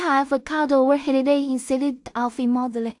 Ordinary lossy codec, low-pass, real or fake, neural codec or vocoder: AAC, 64 kbps; 10.8 kHz; fake; codec, 16 kHz in and 24 kHz out, 0.4 kbps, LongCat-Audio-Codec, two codebook decoder